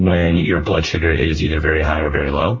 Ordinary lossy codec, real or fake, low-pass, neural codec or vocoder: MP3, 32 kbps; fake; 7.2 kHz; codec, 24 kHz, 1 kbps, SNAC